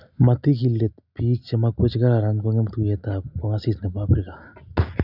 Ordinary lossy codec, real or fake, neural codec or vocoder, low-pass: none; real; none; 5.4 kHz